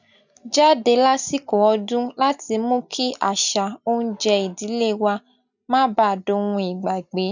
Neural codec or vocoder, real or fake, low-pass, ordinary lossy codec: none; real; 7.2 kHz; none